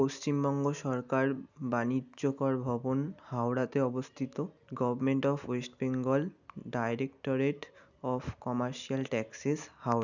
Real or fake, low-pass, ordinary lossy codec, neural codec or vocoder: real; 7.2 kHz; none; none